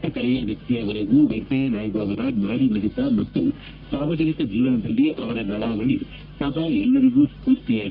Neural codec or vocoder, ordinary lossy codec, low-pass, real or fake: codec, 44.1 kHz, 1.7 kbps, Pupu-Codec; none; 5.4 kHz; fake